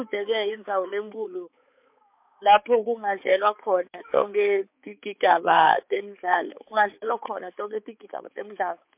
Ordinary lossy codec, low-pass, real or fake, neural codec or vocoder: MP3, 32 kbps; 3.6 kHz; fake; codec, 16 kHz, 4 kbps, X-Codec, HuBERT features, trained on balanced general audio